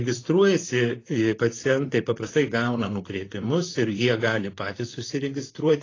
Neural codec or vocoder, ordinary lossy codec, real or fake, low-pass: vocoder, 44.1 kHz, 128 mel bands, Pupu-Vocoder; AAC, 32 kbps; fake; 7.2 kHz